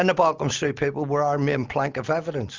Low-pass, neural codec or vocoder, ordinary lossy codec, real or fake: 7.2 kHz; none; Opus, 32 kbps; real